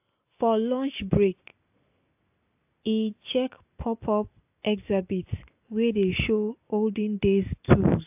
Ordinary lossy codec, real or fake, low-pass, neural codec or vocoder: AAC, 32 kbps; real; 3.6 kHz; none